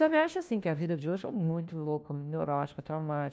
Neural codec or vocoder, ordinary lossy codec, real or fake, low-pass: codec, 16 kHz, 1 kbps, FunCodec, trained on LibriTTS, 50 frames a second; none; fake; none